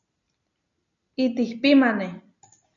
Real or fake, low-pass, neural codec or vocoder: real; 7.2 kHz; none